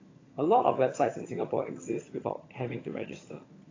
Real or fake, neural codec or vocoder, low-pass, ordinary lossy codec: fake; vocoder, 22.05 kHz, 80 mel bands, HiFi-GAN; 7.2 kHz; AAC, 32 kbps